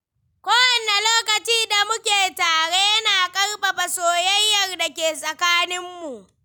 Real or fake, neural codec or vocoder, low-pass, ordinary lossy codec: real; none; none; none